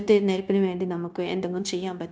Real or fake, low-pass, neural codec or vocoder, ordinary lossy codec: fake; none; codec, 16 kHz, 0.9 kbps, LongCat-Audio-Codec; none